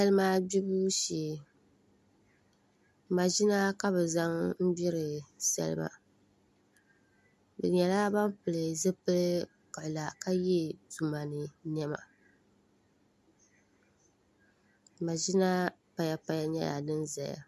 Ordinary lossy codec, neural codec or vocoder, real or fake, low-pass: MP3, 96 kbps; none; real; 14.4 kHz